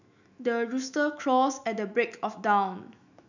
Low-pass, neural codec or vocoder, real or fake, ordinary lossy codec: 7.2 kHz; autoencoder, 48 kHz, 128 numbers a frame, DAC-VAE, trained on Japanese speech; fake; none